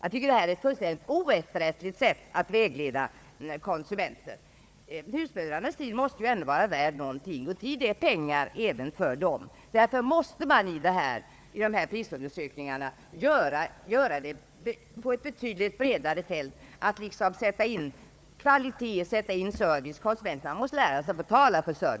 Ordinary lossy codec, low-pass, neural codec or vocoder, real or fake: none; none; codec, 16 kHz, 4 kbps, FunCodec, trained on Chinese and English, 50 frames a second; fake